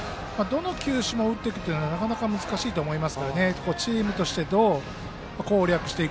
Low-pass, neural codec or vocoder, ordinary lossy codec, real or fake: none; none; none; real